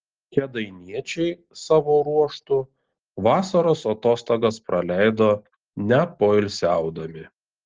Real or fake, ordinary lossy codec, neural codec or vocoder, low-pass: real; Opus, 16 kbps; none; 7.2 kHz